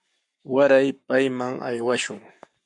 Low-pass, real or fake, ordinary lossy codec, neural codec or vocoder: 10.8 kHz; fake; MP3, 64 kbps; codec, 44.1 kHz, 7.8 kbps, Pupu-Codec